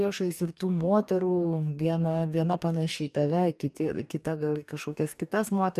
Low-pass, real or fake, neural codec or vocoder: 14.4 kHz; fake; codec, 44.1 kHz, 2.6 kbps, DAC